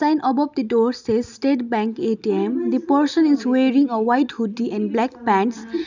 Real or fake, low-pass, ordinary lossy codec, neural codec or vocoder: real; 7.2 kHz; none; none